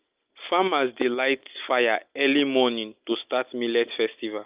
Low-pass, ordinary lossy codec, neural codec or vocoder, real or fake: 3.6 kHz; Opus, 24 kbps; none; real